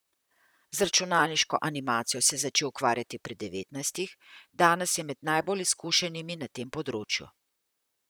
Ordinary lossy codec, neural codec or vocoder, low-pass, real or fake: none; vocoder, 44.1 kHz, 128 mel bands, Pupu-Vocoder; none; fake